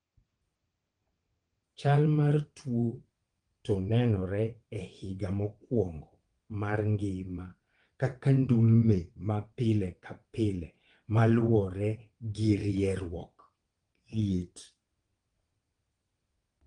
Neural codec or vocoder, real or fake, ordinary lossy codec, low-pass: vocoder, 22.05 kHz, 80 mel bands, WaveNeXt; fake; Opus, 24 kbps; 9.9 kHz